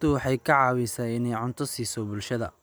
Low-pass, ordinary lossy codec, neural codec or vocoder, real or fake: none; none; none; real